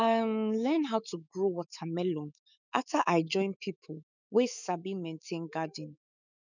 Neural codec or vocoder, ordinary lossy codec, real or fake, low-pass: autoencoder, 48 kHz, 128 numbers a frame, DAC-VAE, trained on Japanese speech; none; fake; 7.2 kHz